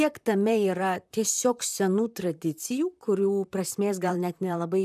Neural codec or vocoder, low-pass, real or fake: vocoder, 44.1 kHz, 128 mel bands, Pupu-Vocoder; 14.4 kHz; fake